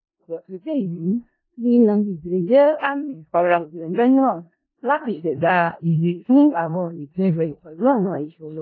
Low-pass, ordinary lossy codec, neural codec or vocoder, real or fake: 7.2 kHz; AAC, 32 kbps; codec, 16 kHz in and 24 kHz out, 0.4 kbps, LongCat-Audio-Codec, four codebook decoder; fake